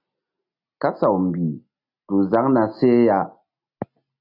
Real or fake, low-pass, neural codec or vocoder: real; 5.4 kHz; none